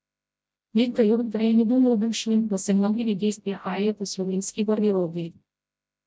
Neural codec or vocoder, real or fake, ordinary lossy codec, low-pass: codec, 16 kHz, 0.5 kbps, FreqCodec, smaller model; fake; none; none